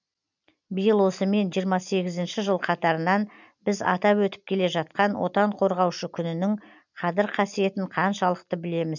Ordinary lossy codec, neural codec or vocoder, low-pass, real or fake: none; none; 7.2 kHz; real